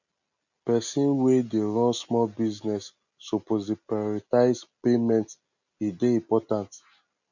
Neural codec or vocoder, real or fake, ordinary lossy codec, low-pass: none; real; none; 7.2 kHz